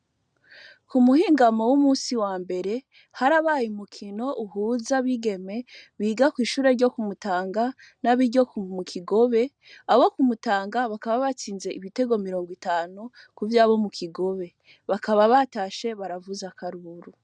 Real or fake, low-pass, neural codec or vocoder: real; 9.9 kHz; none